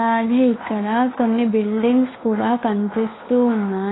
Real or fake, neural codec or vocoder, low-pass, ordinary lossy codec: fake; codec, 16 kHz, 2 kbps, FunCodec, trained on Chinese and English, 25 frames a second; 7.2 kHz; AAC, 16 kbps